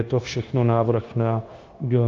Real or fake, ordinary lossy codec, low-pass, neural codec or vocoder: fake; Opus, 32 kbps; 7.2 kHz; codec, 16 kHz, 0.9 kbps, LongCat-Audio-Codec